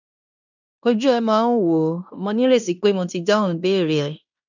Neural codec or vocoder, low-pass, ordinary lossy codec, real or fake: codec, 16 kHz in and 24 kHz out, 0.9 kbps, LongCat-Audio-Codec, fine tuned four codebook decoder; 7.2 kHz; none; fake